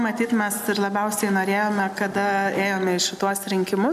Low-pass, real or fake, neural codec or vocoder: 14.4 kHz; real; none